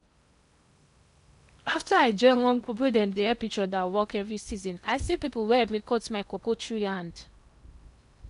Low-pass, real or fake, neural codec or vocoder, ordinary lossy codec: 10.8 kHz; fake; codec, 16 kHz in and 24 kHz out, 0.8 kbps, FocalCodec, streaming, 65536 codes; none